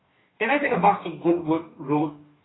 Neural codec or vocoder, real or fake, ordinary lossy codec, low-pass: codec, 44.1 kHz, 2.6 kbps, DAC; fake; AAC, 16 kbps; 7.2 kHz